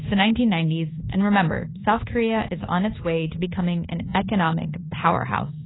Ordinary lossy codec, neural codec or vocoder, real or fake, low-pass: AAC, 16 kbps; codec, 24 kHz, 1.2 kbps, DualCodec; fake; 7.2 kHz